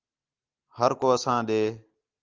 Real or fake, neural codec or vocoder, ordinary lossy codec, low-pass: real; none; Opus, 32 kbps; 7.2 kHz